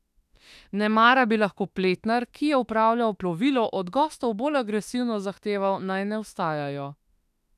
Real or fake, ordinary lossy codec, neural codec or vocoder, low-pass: fake; none; autoencoder, 48 kHz, 32 numbers a frame, DAC-VAE, trained on Japanese speech; 14.4 kHz